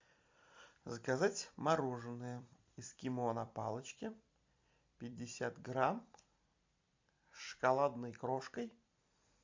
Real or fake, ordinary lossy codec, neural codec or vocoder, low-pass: fake; MP3, 64 kbps; vocoder, 44.1 kHz, 128 mel bands every 256 samples, BigVGAN v2; 7.2 kHz